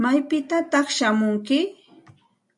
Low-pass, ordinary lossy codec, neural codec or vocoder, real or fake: 10.8 kHz; AAC, 64 kbps; none; real